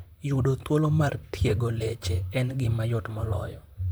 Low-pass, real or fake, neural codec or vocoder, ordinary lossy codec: none; fake; vocoder, 44.1 kHz, 128 mel bands, Pupu-Vocoder; none